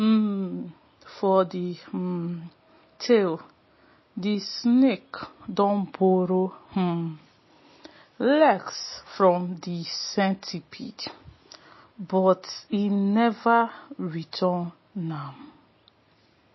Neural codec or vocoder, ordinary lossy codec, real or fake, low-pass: none; MP3, 24 kbps; real; 7.2 kHz